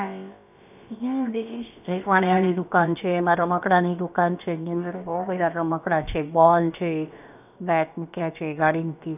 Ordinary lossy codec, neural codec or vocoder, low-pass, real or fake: none; codec, 16 kHz, about 1 kbps, DyCAST, with the encoder's durations; 3.6 kHz; fake